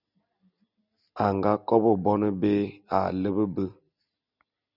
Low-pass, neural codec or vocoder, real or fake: 5.4 kHz; none; real